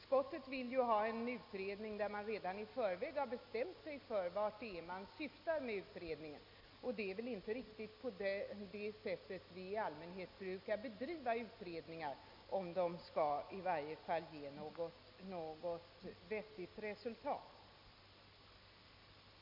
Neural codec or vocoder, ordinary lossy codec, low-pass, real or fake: none; MP3, 48 kbps; 5.4 kHz; real